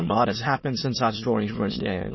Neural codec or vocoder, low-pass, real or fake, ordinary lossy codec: autoencoder, 22.05 kHz, a latent of 192 numbers a frame, VITS, trained on many speakers; 7.2 kHz; fake; MP3, 24 kbps